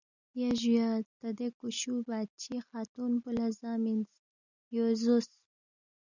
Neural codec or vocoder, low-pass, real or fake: none; 7.2 kHz; real